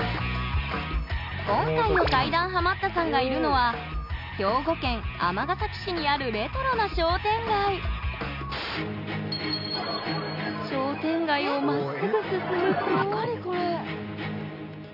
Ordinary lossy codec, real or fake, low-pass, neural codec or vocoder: none; real; 5.4 kHz; none